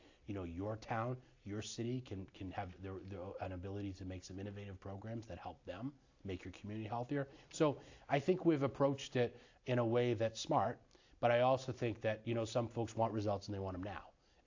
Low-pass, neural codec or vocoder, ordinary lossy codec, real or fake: 7.2 kHz; none; AAC, 48 kbps; real